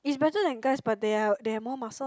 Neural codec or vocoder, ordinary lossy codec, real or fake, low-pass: none; none; real; none